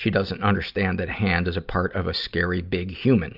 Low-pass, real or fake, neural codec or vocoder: 5.4 kHz; real; none